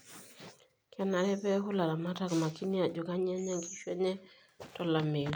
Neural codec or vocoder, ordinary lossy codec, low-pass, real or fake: none; none; none; real